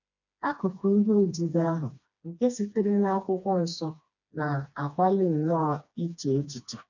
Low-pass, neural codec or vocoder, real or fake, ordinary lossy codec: 7.2 kHz; codec, 16 kHz, 2 kbps, FreqCodec, smaller model; fake; none